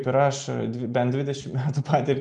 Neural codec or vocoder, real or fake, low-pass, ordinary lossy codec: none; real; 9.9 kHz; MP3, 96 kbps